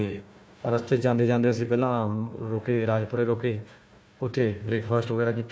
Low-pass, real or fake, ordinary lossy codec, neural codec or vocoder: none; fake; none; codec, 16 kHz, 1 kbps, FunCodec, trained on Chinese and English, 50 frames a second